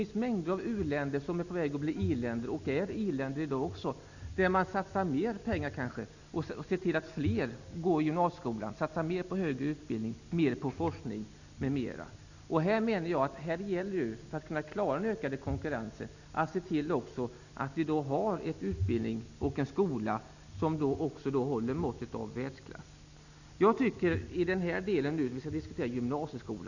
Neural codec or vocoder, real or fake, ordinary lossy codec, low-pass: none; real; none; 7.2 kHz